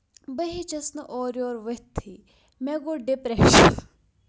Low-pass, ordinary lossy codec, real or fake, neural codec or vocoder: none; none; real; none